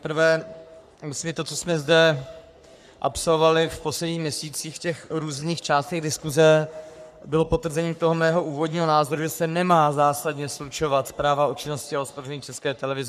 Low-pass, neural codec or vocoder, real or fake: 14.4 kHz; codec, 44.1 kHz, 3.4 kbps, Pupu-Codec; fake